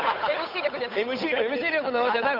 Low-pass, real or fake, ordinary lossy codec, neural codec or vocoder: 5.4 kHz; fake; none; codec, 16 kHz, 8 kbps, FunCodec, trained on Chinese and English, 25 frames a second